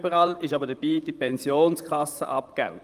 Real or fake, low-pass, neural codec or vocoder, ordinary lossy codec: fake; 14.4 kHz; vocoder, 44.1 kHz, 128 mel bands, Pupu-Vocoder; Opus, 32 kbps